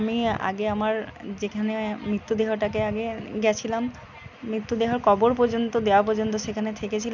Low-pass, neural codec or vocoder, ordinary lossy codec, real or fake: 7.2 kHz; none; none; real